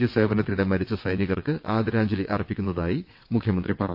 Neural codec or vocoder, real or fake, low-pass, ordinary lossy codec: vocoder, 22.05 kHz, 80 mel bands, WaveNeXt; fake; 5.4 kHz; MP3, 32 kbps